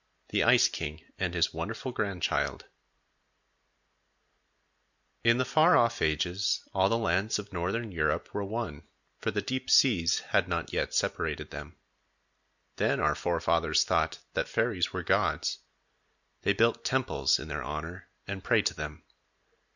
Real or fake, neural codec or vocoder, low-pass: real; none; 7.2 kHz